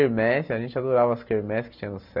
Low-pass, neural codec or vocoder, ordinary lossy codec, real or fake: 5.4 kHz; none; none; real